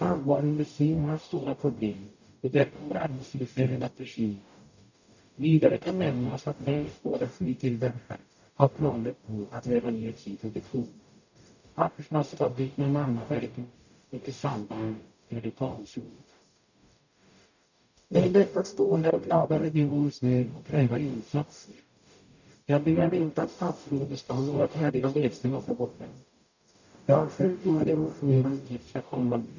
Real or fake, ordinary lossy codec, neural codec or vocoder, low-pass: fake; none; codec, 44.1 kHz, 0.9 kbps, DAC; 7.2 kHz